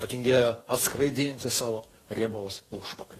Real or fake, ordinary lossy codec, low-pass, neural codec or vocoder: fake; AAC, 48 kbps; 14.4 kHz; codec, 44.1 kHz, 2.6 kbps, DAC